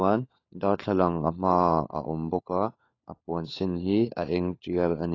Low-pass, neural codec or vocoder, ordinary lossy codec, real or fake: 7.2 kHz; codec, 16 kHz, 4 kbps, FunCodec, trained on LibriTTS, 50 frames a second; AAC, 32 kbps; fake